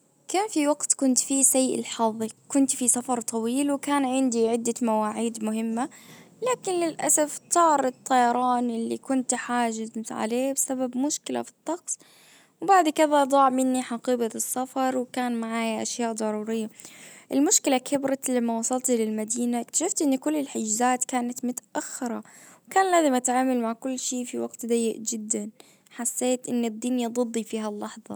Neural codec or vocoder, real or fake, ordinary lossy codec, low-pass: none; real; none; none